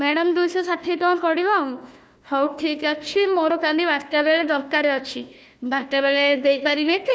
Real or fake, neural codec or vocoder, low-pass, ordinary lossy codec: fake; codec, 16 kHz, 1 kbps, FunCodec, trained on Chinese and English, 50 frames a second; none; none